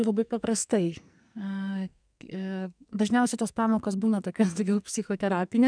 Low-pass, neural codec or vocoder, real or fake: 9.9 kHz; codec, 44.1 kHz, 2.6 kbps, SNAC; fake